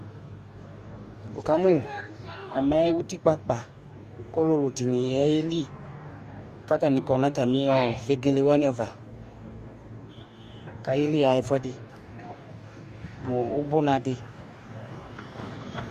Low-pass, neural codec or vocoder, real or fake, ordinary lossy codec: 14.4 kHz; codec, 44.1 kHz, 2.6 kbps, DAC; fake; Opus, 64 kbps